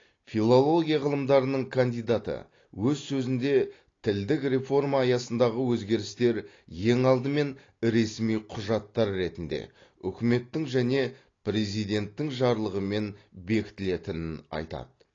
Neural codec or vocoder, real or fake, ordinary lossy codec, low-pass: none; real; AAC, 32 kbps; 7.2 kHz